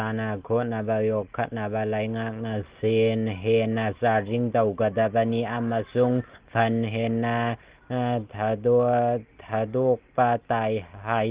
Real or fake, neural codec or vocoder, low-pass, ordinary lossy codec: real; none; 3.6 kHz; Opus, 16 kbps